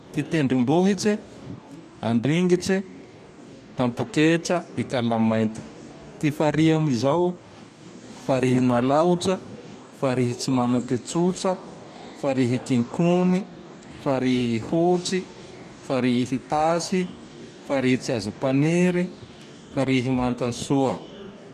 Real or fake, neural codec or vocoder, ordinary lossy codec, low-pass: fake; codec, 44.1 kHz, 2.6 kbps, DAC; none; 14.4 kHz